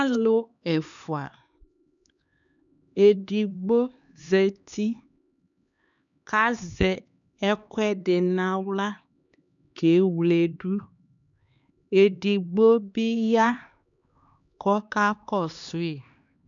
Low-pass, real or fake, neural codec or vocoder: 7.2 kHz; fake; codec, 16 kHz, 2 kbps, X-Codec, HuBERT features, trained on LibriSpeech